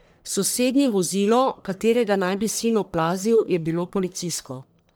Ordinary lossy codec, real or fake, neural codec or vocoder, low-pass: none; fake; codec, 44.1 kHz, 1.7 kbps, Pupu-Codec; none